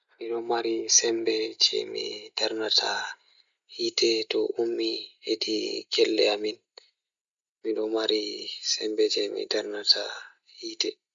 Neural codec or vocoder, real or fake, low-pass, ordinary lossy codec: none; real; 7.2 kHz; Opus, 64 kbps